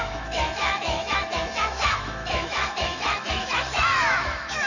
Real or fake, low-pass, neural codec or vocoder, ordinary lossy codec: fake; 7.2 kHz; autoencoder, 48 kHz, 128 numbers a frame, DAC-VAE, trained on Japanese speech; none